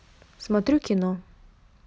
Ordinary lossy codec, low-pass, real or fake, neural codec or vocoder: none; none; real; none